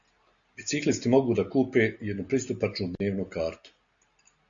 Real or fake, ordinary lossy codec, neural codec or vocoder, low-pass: real; Opus, 64 kbps; none; 7.2 kHz